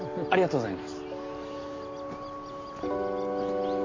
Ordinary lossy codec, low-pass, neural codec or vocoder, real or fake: AAC, 32 kbps; 7.2 kHz; none; real